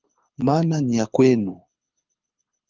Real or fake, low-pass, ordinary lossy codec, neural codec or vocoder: fake; 7.2 kHz; Opus, 32 kbps; codec, 24 kHz, 6 kbps, HILCodec